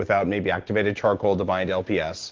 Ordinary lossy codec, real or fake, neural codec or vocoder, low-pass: Opus, 16 kbps; real; none; 7.2 kHz